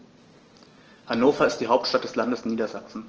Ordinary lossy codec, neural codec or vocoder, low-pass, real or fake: Opus, 16 kbps; none; 7.2 kHz; real